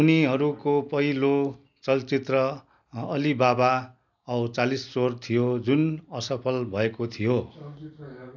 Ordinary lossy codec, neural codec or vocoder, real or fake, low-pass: none; none; real; 7.2 kHz